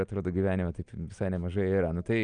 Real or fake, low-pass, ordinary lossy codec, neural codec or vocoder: real; 9.9 kHz; Opus, 32 kbps; none